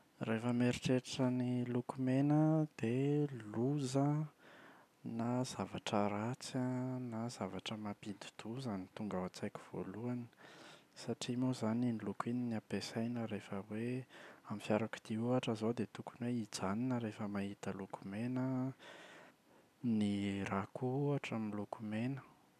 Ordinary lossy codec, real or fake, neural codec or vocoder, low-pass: none; real; none; 14.4 kHz